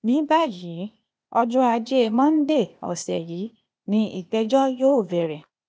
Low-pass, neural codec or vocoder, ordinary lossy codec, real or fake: none; codec, 16 kHz, 0.8 kbps, ZipCodec; none; fake